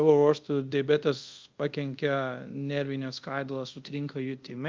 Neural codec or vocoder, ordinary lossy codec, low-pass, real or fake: codec, 24 kHz, 0.5 kbps, DualCodec; Opus, 24 kbps; 7.2 kHz; fake